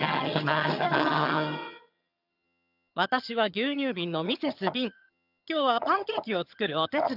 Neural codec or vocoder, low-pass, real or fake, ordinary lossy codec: vocoder, 22.05 kHz, 80 mel bands, HiFi-GAN; 5.4 kHz; fake; none